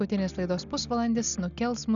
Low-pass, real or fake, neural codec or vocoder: 7.2 kHz; real; none